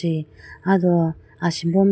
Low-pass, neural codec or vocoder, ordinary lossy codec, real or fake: none; none; none; real